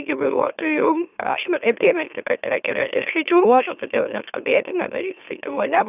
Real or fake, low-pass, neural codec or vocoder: fake; 3.6 kHz; autoencoder, 44.1 kHz, a latent of 192 numbers a frame, MeloTTS